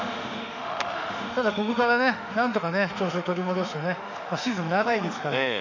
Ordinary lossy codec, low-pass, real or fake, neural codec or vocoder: none; 7.2 kHz; fake; autoencoder, 48 kHz, 32 numbers a frame, DAC-VAE, trained on Japanese speech